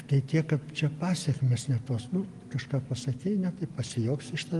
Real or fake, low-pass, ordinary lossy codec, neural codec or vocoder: real; 10.8 kHz; Opus, 24 kbps; none